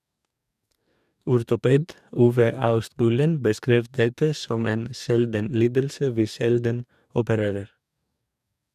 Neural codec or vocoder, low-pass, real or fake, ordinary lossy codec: codec, 44.1 kHz, 2.6 kbps, DAC; 14.4 kHz; fake; none